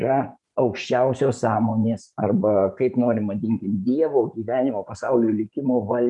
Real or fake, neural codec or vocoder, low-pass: fake; vocoder, 24 kHz, 100 mel bands, Vocos; 10.8 kHz